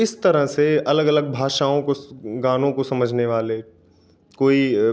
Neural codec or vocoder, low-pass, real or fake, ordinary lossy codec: none; none; real; none